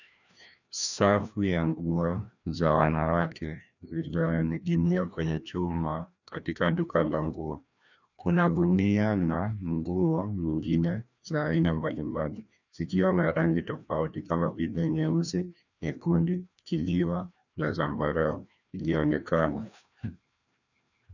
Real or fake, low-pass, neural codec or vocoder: fake; 7.2 kHz; codec, 16 kHz, 1 kbps, FreqCodec, larger model